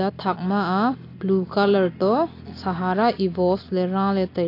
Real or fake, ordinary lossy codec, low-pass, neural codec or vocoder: real; AAC, 32 kbps; 5.4 kHz; none